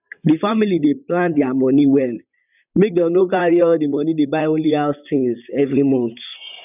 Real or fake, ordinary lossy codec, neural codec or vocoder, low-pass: fake; none; vocoder, 44.1 kHz, 128 mel bands, Pupu-Vocoder; 3.6 kHz